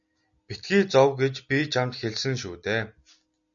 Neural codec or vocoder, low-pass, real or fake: none; 7.2 kHz; real